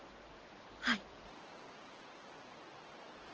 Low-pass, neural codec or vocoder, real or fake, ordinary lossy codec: 7.2 kHz; vocoder, 44.1 kHz, 128 mel bands every 512 samples, BigVGAN v2; fake; Opus, 16 kbps